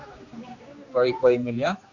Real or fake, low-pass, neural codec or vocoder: fake; 7.2 kHz; codec, 16 kHz, 2 kbps, X-Codec, HuBERT features, trained on general audio